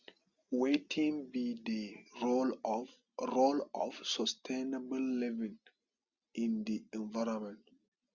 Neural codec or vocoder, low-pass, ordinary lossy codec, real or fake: none; 7.2 kHz; Opus, 64 kbps; real